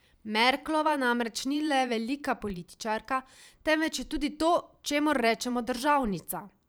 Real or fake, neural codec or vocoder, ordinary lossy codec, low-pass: fake; vocoder, 44.1 kHz, 128 mel bands every 512 samples, BigVGAN v2; none; none